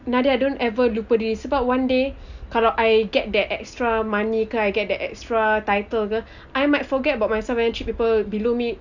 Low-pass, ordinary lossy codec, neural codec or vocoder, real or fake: 7.2 kHz; none; none; real